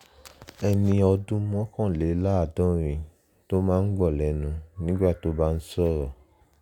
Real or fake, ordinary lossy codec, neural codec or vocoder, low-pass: real; none; none; 19.8 kHz